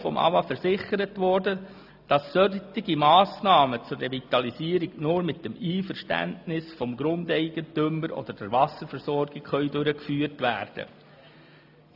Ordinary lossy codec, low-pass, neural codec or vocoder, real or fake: AAC, 48 kbps; 5.4 kHz; none; real